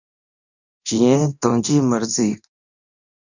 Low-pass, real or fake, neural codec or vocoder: 7.2 kHz; fake; codec, 24 kHz, 0.9 kbps, DualCodec